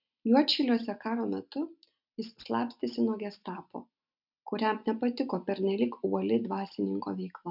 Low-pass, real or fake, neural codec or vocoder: 5.4 kHz; real; none